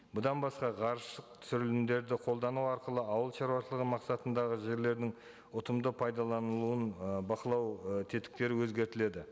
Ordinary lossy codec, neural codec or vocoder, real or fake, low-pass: none; none; real; none